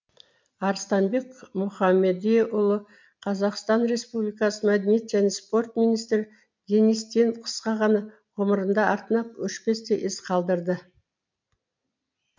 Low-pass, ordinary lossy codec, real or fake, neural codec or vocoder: 7.2 kHz; MP3, 64 kbps; real; none